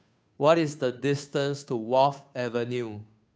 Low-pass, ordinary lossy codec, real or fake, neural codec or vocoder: none; none; fake; codec, 16 kHz, 2 kbps, FunCodec, trained on Chinese and English, 25 frames a second